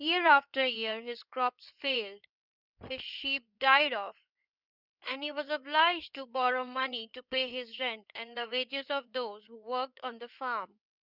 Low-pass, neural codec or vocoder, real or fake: 5.4 kHz; codec, 16 kHz in and 24 kHz out, 2.2 kbps, FireRedTTS-2 codec; fake